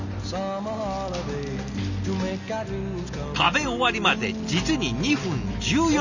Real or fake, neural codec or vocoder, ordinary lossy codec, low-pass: real; none; none; 7.2 kHz